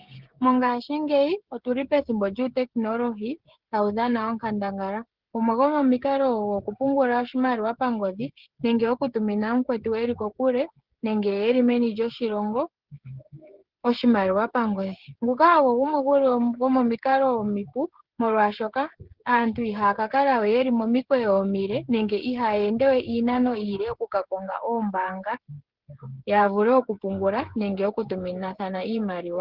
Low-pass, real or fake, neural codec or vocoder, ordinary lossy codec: 5.4 kHz; fake; codec, 16 kHz, 16 kbps, FreqCodec, smaller model; Opus, 16 kbps